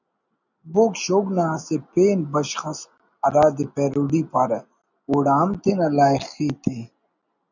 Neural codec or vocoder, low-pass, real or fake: none; 7.2 kHz; real